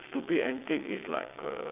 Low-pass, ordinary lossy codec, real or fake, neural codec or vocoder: 3.6 kHz; AAC, 32 kbps; fake; vocoder, 22.05 kHz, 80 mel bands, WaveNeXt